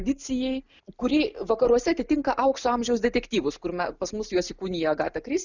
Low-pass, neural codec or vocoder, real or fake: 7.2 kHz; none; real